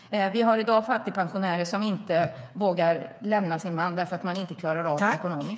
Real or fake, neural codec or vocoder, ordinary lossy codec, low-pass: fake; codec, 16 kHz, 4 kbps, FreqCodec, smaller model; none; none